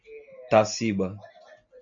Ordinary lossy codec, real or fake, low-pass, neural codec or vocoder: MP3, 48 kbps; real; 7.2 kHz; none